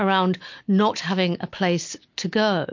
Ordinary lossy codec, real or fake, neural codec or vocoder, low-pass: MP3, 48 kbps; real; none; 7.2 kHz